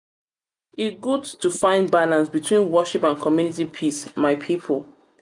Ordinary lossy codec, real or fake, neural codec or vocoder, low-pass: none; real; none; 10.8 kHz